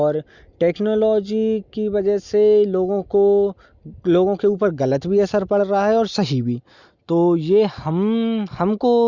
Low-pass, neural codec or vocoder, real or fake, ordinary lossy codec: 7.2 kHz; none; real; Opus, 64 kbps